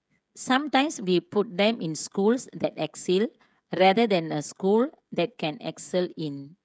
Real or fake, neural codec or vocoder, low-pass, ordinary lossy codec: fake; codec, 16 kHz, 16 kbps, FreqCodec, smaller model; none; none